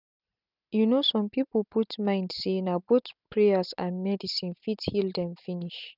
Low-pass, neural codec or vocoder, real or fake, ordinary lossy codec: 5.4 kHz; none; real; none